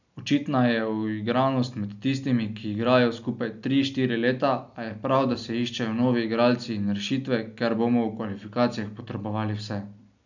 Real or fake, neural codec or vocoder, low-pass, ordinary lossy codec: real; none; 7.2 kHz; none